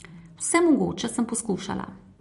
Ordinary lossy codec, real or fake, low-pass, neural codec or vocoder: MP3, 48 kbps; fake; 14.4 kHz; vocoder, 44.1 kHz, 128 mel bands every 256 samples, BigVGAN v2